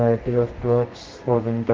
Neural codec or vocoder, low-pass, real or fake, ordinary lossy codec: codec, 32 kHz, 1.9 kbps, SNAC; 7.2 kHz; fake; Opus, 16 kbps